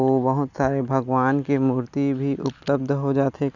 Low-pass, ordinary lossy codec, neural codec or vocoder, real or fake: 7.2 kHz; none; none; real